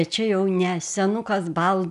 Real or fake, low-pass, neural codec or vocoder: real; 10.8 kHz; none